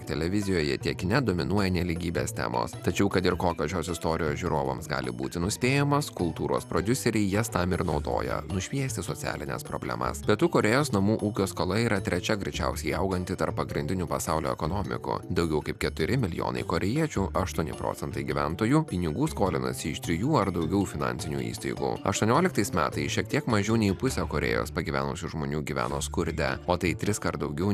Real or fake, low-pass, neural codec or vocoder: real; 14.4 kHz; none